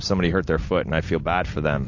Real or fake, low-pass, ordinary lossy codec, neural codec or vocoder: real; 7.2 kHz; AAC, 48 kbps; none